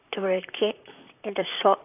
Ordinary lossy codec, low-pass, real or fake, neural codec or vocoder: AAC, 32 kbps; 3.6 kHz; fake; vocoder, 44.1 kHz, 128 mel bands, Pupu-Vocoder